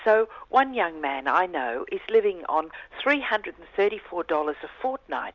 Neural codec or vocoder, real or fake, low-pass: none; real; 7.2 kHz